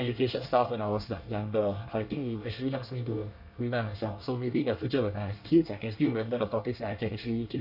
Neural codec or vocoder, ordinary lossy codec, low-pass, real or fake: codec, 24 kHz, 1 kbps, SNAC; none; 5.4 kHz; fake